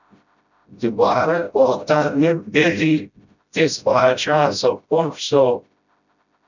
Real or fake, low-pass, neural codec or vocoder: fake; 7.2 kHz; codec, 16 kHz, 0.5 kbps, FreqCodec, smaller model